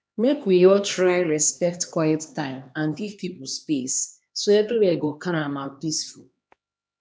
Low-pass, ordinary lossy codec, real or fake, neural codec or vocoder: none; none; fake; codec, 16 kHz, 2 kbps, X-Codec, HuBERT features, trained on LibriSpeech